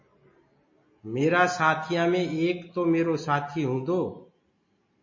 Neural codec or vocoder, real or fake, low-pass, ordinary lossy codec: none; real; 7.2 kHz; MP3, 32 kbps